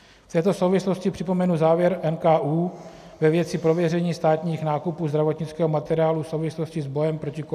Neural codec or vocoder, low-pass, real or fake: none; 14.4 kHz; real